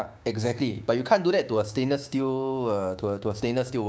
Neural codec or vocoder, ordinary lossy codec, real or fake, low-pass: codec, 16 kHz, 6 kbps, DAC; none; fake; none